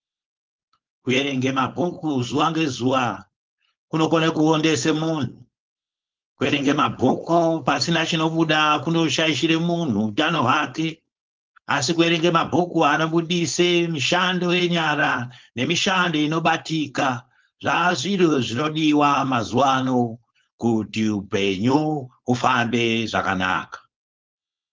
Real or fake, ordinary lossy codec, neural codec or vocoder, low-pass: fake; Opus, 24 kbps; codec, 16 kHz, 4.8 kbps, FACodec; 7.2 kHz